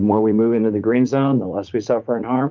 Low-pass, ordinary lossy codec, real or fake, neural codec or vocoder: 7.2 kHz; Opus, 24 kbps; fake; vocoder, 44.1 kHz, 80 mel bands, Vocos